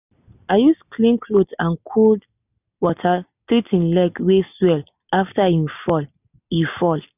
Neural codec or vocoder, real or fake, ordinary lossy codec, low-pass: none; real; none; 3.6 kHz